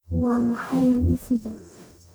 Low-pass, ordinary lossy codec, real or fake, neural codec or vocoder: none; none; fake; codec, 44.1 kHz, 0.9 kbps, DAC